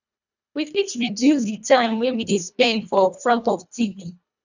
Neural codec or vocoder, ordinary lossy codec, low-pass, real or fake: codec, 24 kHz, 1.5 kbps, HILCodec; none; 7.2 kHz; fake